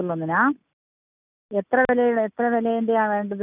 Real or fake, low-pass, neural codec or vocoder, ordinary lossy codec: real; 3.6 kHz; none; none